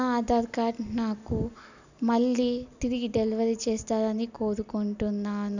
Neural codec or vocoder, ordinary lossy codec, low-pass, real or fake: none; none; 7.2 kHz; real